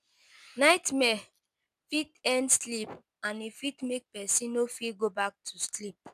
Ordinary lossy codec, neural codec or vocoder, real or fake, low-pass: none; none; real; 14.4 kHz